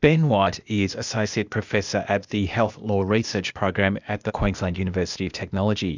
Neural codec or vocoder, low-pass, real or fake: codec, 16 kHz, 0.8 kbps, ZipCodec; 7.2 kHz; fake